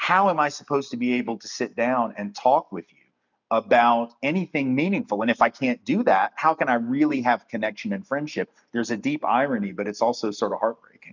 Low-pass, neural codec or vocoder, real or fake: 7.2 kHz; none; real